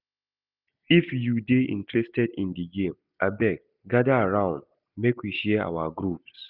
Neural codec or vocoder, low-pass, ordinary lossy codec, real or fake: none; 5.4 kHz; none; real